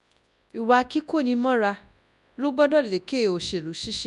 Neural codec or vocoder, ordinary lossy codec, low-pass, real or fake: codec, 24 kHz, 0.9 kbps, WavTokenizer, large speech release; none; 10.8 kHz; fake